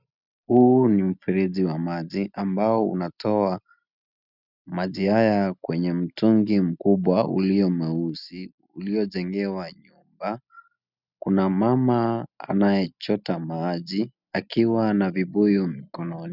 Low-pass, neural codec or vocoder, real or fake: 5.4 kHz; none; real